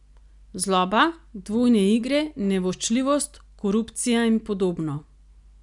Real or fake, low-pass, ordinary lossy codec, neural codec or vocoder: real; 10.8 kHz; none; none